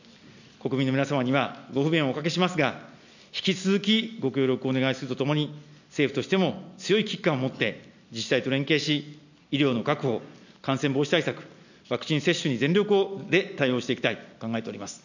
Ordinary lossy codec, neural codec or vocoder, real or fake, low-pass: none; none; real; 7.2 kHz